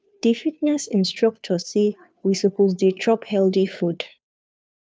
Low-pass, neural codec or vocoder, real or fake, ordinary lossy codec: none; codec, 16 kHz, 2 kbps, FunCodec, trained on Chinese and English, 25 frames a second; fake; none